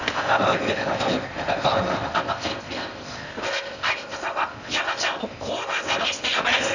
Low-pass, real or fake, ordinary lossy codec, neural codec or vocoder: 7.2 kHz; fake; none; codec, 16 kHz in and 24 kHz out, 0.6 kbps, FocalCodec, streaming, 4096 codes